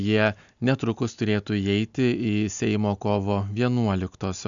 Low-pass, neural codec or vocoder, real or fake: 7.2 kHz; none; real